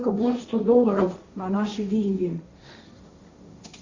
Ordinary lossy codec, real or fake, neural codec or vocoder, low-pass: Opus, 64 kbps; fake; codec, 16 kHz, 1.1 kbps, Voila-Tokenizer; 7.2 kHz